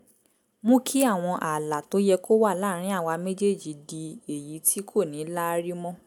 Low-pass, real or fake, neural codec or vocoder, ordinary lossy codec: none; real; none; none